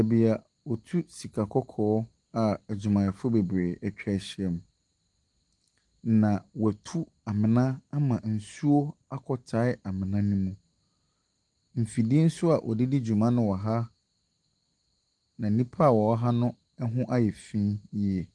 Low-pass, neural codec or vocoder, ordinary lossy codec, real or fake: 10.8 kHz; none; Opus, 24 kbps; real